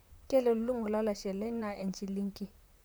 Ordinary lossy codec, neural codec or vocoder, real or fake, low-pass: none; vocoder, 44.1 kHz, 128 mel bands, Pupu-Vocoder; fake; none